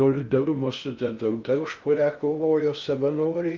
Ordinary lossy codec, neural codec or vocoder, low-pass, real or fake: Opus, 24 kbps; codec, 16 kHz in and 24 kHz out, 0.6 kbps, FocalCodec, streaming, 4096 codes; 7.2 kHz; fake